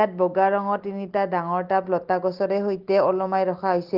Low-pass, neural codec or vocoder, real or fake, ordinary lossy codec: 5.4 kHz; none; real; Opus, 16 kbps